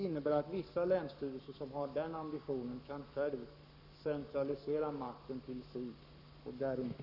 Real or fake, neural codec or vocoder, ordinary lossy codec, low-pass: fake; codec, 44.1 kHz, 7.8 kbps, Pupu-Codec; none; 5.4 kHz